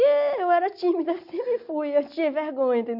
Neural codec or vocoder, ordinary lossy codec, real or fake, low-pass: none; none; real; 5.4 kHz